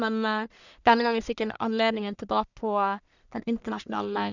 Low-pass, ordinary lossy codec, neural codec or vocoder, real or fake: 7.2 kHz; none; codec, 44.1 kHz, 1.7 kbps, Pupu-Codec; fake